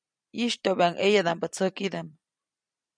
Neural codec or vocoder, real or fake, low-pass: vocoder, 44.1 kHz, 128 mel bands every 256 samples, BigVGAN v2; fake; 9.9 kHz